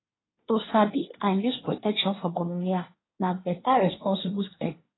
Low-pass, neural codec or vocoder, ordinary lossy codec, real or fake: 7.2 kHz; codec, 24 kHz, 1 kbps, SNAC; AAC, 16 kbps; fake